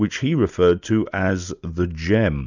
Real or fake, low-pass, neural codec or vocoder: real; 7.2 kHz; none